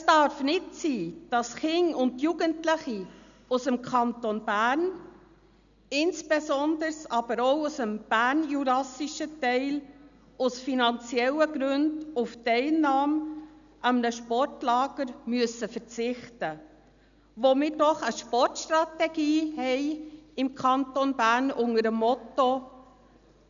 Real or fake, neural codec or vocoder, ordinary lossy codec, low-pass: real; none; none; 7.2 kHz